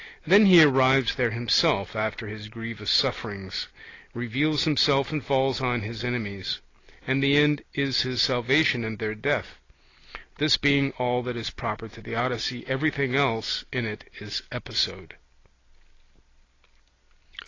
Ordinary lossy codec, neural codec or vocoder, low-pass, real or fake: AAC, 32 kbps; none; 7.2 kHz; real